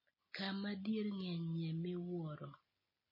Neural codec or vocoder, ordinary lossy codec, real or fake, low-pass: none; MP3, 24 kbps; real; 5.4 kHz